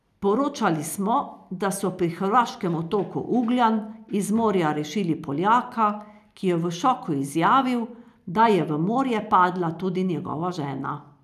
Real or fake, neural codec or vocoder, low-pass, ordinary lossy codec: real; none; 14.4 kHz; none